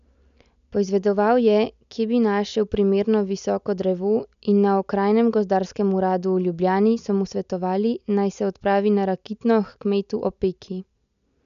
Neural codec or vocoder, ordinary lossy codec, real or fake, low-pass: none; none; real; 7.2 kHz